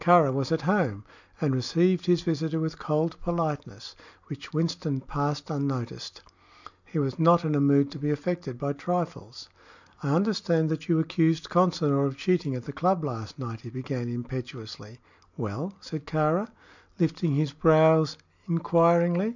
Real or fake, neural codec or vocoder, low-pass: real; none; 7.2 kHz